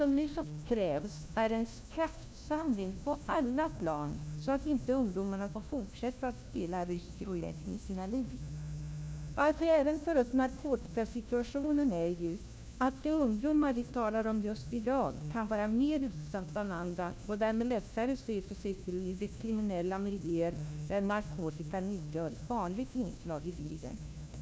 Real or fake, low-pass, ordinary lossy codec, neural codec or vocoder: fake; none; none; codec, 16 kHz, 1 kbps, FunCodec, trained on LibriTTS, 50 frames a second